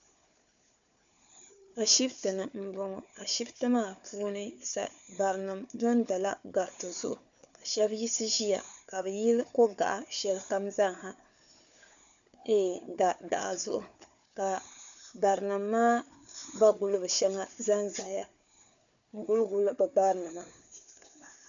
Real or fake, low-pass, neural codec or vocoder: fake; 7.2 kHz; codec, 16 kHz, 4 kbps, FunCodec, trained on Chinese and English, 50 frames a second